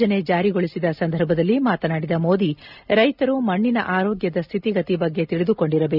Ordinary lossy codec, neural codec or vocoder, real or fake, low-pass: none; none; real; 5.4 kHz